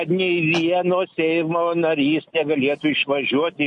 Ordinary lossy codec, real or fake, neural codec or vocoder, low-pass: MP3, 48 kbps; real; none; 10.8 kHz